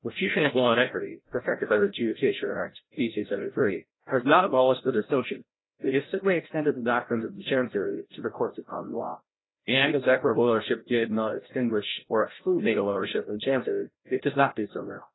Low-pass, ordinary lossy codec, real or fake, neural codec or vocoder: 7.2 kHz; AAC, 16 kbps; fake; codec, 16 kHz, 0.5 kbps, FreqCodec, larger model